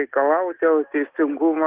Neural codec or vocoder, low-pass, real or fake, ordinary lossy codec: none; 3.6 kHz; real; Opus, 16 kbps